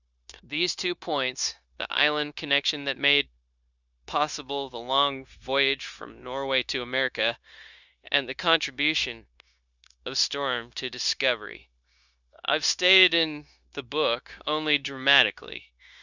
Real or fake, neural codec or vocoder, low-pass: fake; codec, 16 kHz, 0.9 kbps, LongCat-Audio-Codec; 7.2 kHz